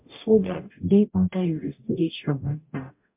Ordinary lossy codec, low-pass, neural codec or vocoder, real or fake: MP3, 32 kbps; 3.6 kHz; codec, 44.1 kHz, 0.9 kbps, DAC; fake